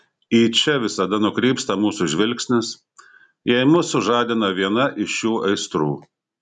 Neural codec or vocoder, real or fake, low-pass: none; real; 10.8 kHz